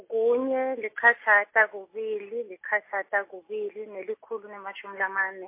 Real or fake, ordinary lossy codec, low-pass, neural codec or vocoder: real; MP3, 24 kbps; 3.6 kHz; none